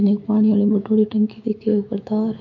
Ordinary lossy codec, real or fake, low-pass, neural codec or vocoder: MP3, 48 kbps; fake; 7.2 kHz; autoencoder, 48 kHz, 128 numbers a frame, DAC-VAE, trained on Japanese speech